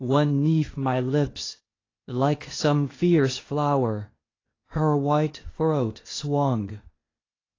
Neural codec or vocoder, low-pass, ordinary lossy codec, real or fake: codec, 16 kHz, 0.8 kbps, ZipCodec; 7.2 kHz; AAC, 32 kbps; fake